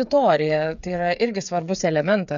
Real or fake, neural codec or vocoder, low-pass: fake; codec, 16 kHz, 8 kbps, FreqCodec, smaller model; 7.2 kHz